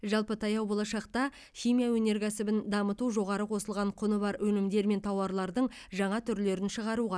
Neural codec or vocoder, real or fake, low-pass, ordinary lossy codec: none; real; none; none